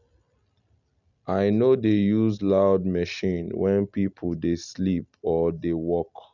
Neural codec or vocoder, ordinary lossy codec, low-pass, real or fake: none; none; 7.2 kHz; real